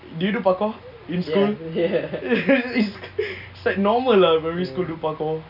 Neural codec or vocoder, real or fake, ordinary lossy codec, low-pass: none; real; none; 5.4 kHz